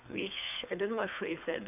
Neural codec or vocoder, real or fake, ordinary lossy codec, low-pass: codec, 24 kHz, 3 kbps, HILCodec; fake; none; 3.6 kHz